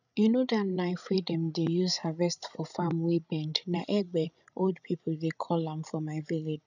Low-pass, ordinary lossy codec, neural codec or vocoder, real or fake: 7.2 kHz; none; codec, 16 kHz, 16 kbps, FreqCodec, larger model; fake